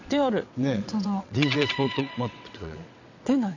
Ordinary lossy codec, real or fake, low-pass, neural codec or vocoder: none; fake; 7.2 kHz; vocoder, 22.05 kHz, 80 mel bands, WaveNeXt